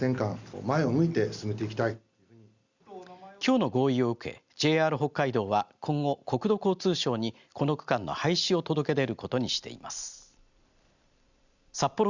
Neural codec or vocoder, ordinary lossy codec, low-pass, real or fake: none; Opus, 64 kbps; 7.2 kHz; real